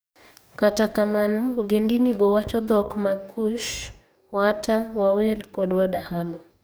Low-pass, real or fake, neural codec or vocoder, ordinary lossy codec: none; fake; codec, 44.1 kHz, 2.6 kbps, DAC; none